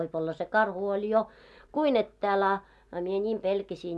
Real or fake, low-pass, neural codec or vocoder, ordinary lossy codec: real; none; none; none